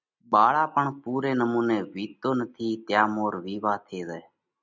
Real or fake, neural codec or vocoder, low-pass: real; none; 7.2 kHz